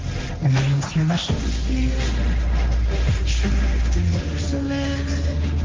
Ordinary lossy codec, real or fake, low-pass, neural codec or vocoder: Opus, 24 kbps; fake; 7.2 kHz; codec, 16 kHz, 1.1 kbps, Voila-Tokenizer